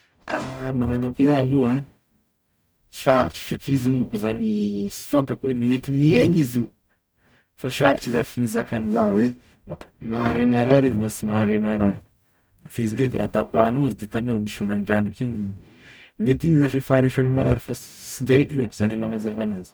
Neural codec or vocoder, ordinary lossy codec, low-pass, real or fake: codec, 44.1 kHz, 0.9 kbps, DAC; none; none; fake